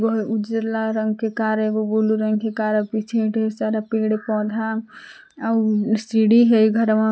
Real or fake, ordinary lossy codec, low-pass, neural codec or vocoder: real; none; none; none